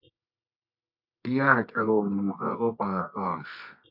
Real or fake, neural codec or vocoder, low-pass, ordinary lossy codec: fake; codec, 24 kHz, 0.9 kbps, WavTokenizer, medium music audio release; 5.4 kHz; AAC, 48 kbps